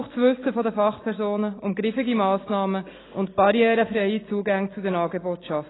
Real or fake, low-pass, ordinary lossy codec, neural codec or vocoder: real; 7.2 kHz; AAC, 16 kbps; none